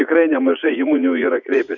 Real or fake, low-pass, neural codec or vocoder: fake; 7.2 kHz; vocoder, 44.1 kHz, 80 mel bands, Vocos